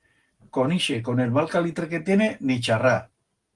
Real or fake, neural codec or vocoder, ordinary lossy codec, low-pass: real; none; Opus, 24 kbps; 10.8 kHz